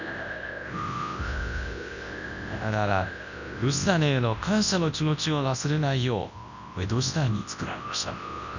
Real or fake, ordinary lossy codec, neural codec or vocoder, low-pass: fake; none; codec, 24 kHz, 0.9 kbps, WavTokenizer, large speech release; 7.2 kHz